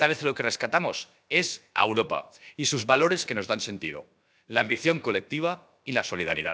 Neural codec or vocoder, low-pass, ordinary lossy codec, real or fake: codec, 16 kHz, about 1 kbps, DyCAST, with the encoder's durations; none; none; fake